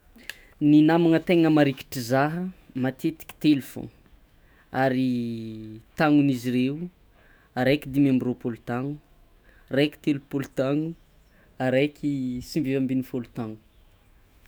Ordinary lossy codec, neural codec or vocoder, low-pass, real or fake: none; autoencoder, 48 kHz, 128 numbers a frame, DAC-VAE, trained on Japanese speech; none; fake